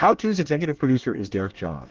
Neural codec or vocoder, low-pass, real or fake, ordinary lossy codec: codec, 24 kHz, 1 kbps, SNAC; 7.2 kHz; fake; Opus, 16 kbps